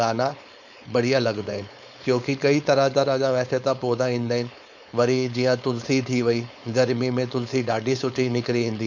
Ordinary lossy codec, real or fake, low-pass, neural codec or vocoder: none; fake; 7.2 kHz; codec, 16 kHz, 4.8 kbps, FACodec